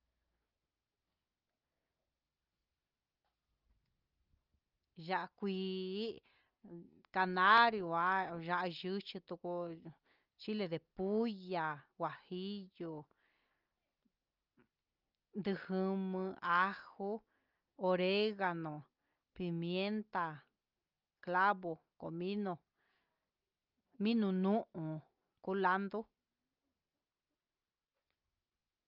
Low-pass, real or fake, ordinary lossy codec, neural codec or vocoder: 5.4 kHz; real; Opus, 24 kbps; none